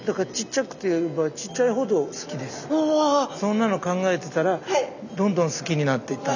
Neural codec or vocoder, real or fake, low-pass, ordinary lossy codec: none; real; 7.2 kHz; none